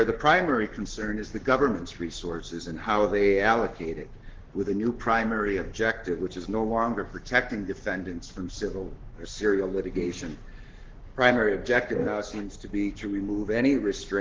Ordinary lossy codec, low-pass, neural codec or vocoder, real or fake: Opus, 16 kbps; 7.2 kHz; codec, 44.1 kHz, 7.8 kbps, Pupu-Codec; fake